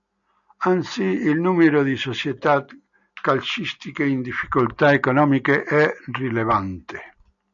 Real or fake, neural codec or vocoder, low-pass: real; none; 7.2 kHz